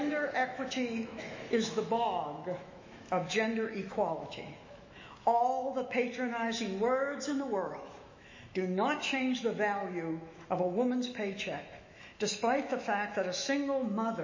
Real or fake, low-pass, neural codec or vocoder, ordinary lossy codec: fake; 7.2 kHz; autoencoder, 48 kHz, 128 numbers a frame, DAC-VAE, trained on Japanese speech; MP3, 32 kbps